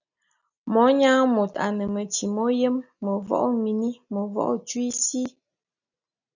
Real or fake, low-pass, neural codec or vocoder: real; 7.2 kHz; none